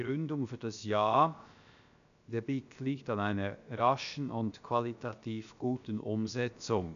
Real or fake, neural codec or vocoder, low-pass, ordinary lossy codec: fake; codec, 16 kHz, about 1 kbps, DyCAST, with the encoder's durations; 7.2 kHz; none